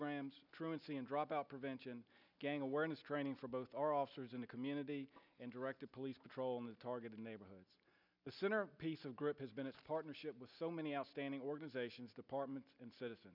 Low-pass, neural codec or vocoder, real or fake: 5.4 kHz; none; real